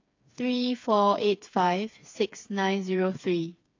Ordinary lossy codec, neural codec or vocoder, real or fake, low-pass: AAC, 48 kbps; codec, 16 kHz, 4 kbps, FreqCodec, smaller model; fake; 7.2 kHz